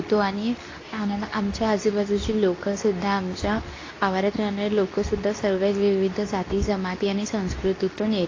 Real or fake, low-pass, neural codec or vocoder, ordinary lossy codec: fake; 7.2 kHz; codec, 24 kHz, 0.9 kbps, WavTokenizer, medium speech release version 2; AAC, 32 kbps